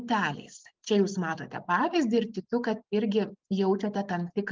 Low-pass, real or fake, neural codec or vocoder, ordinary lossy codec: 7.2 kHz; real; none; Opus, 24 kbps